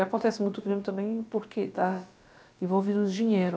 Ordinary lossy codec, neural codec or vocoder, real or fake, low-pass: none; codec, 16 kHz, about 1 kbps, DyCAST, with the encoder's durations; fake; none